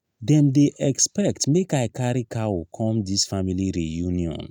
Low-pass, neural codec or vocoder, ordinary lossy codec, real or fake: 19.8 kHz; none; none; real